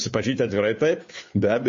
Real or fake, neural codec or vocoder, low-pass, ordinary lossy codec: fake; codec, 16 kHz, 2 kbps, FunCodec, trained on Chinese and English, 25 frames a second; 7.2 kHz; MP3, 32 kbps